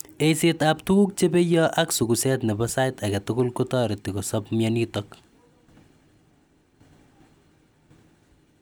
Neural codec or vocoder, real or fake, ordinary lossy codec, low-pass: none; real; none; none